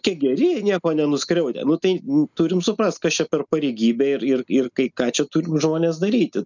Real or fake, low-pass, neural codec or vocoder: real; 7.2 kHz; none